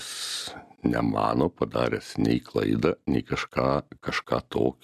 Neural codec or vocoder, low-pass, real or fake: none; 14.4 kHz; real